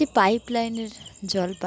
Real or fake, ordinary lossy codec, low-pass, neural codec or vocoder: real; none; none; none